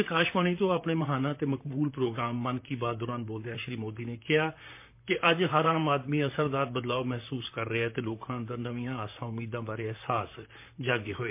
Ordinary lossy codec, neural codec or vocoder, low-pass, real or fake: MP3, 24 kbps; vocoder, 44.1 kHz, 128 mel bands, Pupu-Vocoder; 3.6 kHz; fake